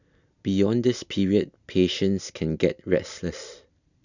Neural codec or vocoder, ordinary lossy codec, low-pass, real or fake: none; none; 7.2 kHz; real